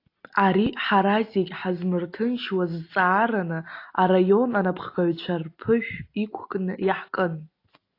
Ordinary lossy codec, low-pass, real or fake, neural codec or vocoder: AAC, 32 kbps; 5.4 kHz; real; none